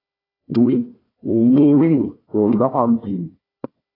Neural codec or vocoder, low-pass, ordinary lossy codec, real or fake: codec, 16 kHz, 1 kbps, FunCodec, trained on Chinese and English, 50 frames a second; 5.4 kHz; AAC, 24 kbps; fake